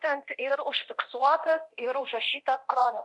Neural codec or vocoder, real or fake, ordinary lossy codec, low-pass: codec, 24 kHz, 0.9 kbps, DualCodec; fake; MP3, 64 kbps; 10.8 kHz